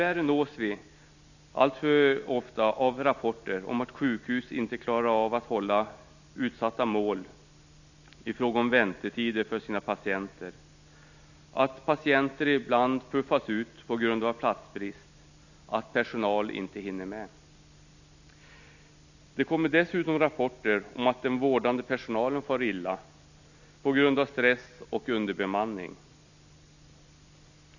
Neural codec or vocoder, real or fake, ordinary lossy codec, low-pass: none; real; none; 7.2 kHz